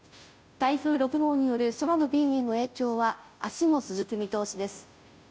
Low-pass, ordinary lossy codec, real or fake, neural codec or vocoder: none; none; fake; codec, 16 kHz, 0.5 kbps, FunCodec, trained on Chinese and English, 25 frames a second